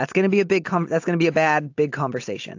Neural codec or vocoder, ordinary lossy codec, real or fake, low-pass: none; AAC, 48 kbps; real; 7.2 kHz